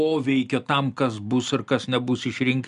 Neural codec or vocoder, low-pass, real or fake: none; 10.8 kHz; real